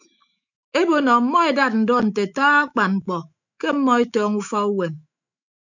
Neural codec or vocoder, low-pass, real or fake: autoencoder, 48 kHz, 128 numbers a frame, DAC-VAE, trained on Japanese speech; 7.2 kHz; fake